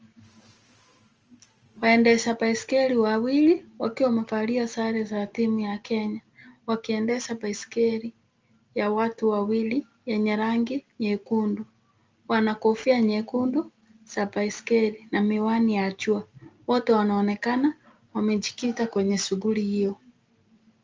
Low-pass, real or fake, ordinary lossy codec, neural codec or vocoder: 7.2 kHz; real; Opus, 24 kbps; none